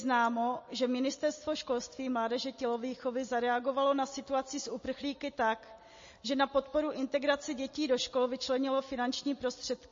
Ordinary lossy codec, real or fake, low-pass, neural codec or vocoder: MP3, 32 kbps; real; 7.2 kHz; none